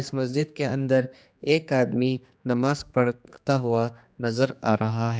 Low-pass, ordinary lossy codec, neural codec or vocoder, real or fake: none; none; codec, 16 kHz, 2 kbps, X-Codec, HuBERT features, trained on general audio; fake